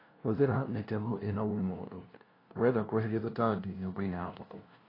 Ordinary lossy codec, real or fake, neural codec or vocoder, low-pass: AAC, 24 kbps; fake; codec, 16 kHz, 0.5 kbps, FunCodec, trained on LibriTTS, 25 frames a second; 5.4 kHz